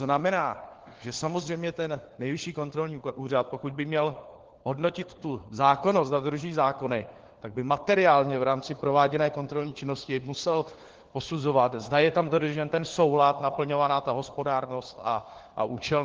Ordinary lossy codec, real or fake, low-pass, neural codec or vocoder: Opus, 16 kbps; fake; 7.2 kHz; codec, 16 kHz, 4 kbps, FunCodec, trained on LibriTTS, 50 frames a second